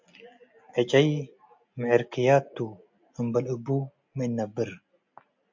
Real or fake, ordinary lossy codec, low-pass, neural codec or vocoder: real; MP3, 48 kbps; 7.2 kHz; none